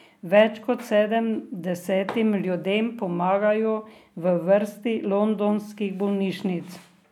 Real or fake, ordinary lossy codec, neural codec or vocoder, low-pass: real; none; none; 19.8 kHz